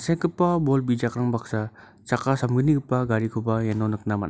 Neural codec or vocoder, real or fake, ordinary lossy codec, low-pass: none; real; none; none